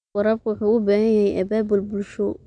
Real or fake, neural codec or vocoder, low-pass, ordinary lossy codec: real; none; 9.9 kHz; none